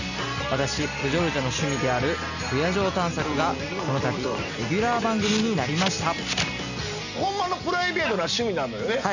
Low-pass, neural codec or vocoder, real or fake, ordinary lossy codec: 7.2 kHz; none; real; none